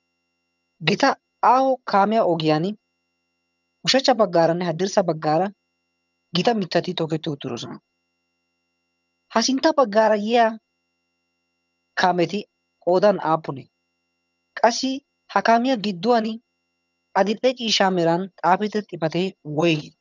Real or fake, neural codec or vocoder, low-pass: fake; vocoder, 22.05 kHz, 80 mel bands, HiFi-GAN; 7.2 kHz